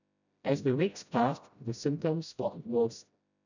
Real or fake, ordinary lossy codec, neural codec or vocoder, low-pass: fake; none; codec, 16 kHz, 0.5 kbps, FreqCodec, smaller model; 7.2 kHz